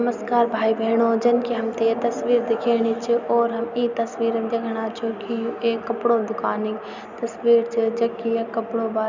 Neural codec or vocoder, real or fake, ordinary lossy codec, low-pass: none; real; none; 7.2 kHz